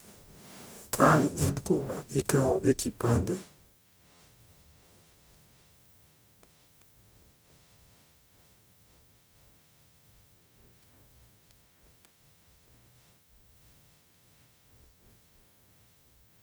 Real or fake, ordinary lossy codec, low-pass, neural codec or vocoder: fake; none; none; codec, 44.1 kHz, 0.9 kbps, DAC